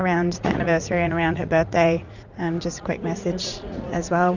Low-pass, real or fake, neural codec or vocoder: 7.2 kHz; fake; vocoder, 44.1 kHz, 80 mel bands, Vocos